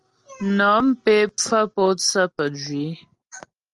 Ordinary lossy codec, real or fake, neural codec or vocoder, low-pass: Opus, 32 kbps; real; none; 10.8 kHz